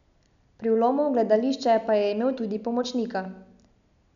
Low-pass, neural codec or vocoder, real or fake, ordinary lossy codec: 7.2 kHz; none; real; none